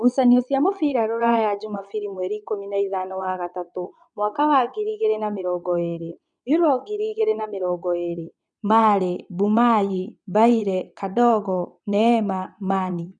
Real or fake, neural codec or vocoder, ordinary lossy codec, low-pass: fake; vocoder, 22.05 kHz, 80 mel bands, WaveNeXt; none; 9.9 kHz